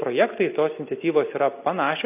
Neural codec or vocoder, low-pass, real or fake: none; 3.6 kHz; real